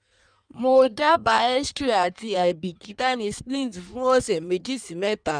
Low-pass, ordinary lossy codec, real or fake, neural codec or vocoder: 9.9 kHz; none; fake; codec, 16 kHz in and 24 kHz out, 1.1 kbps, FireRedTTS-2 codec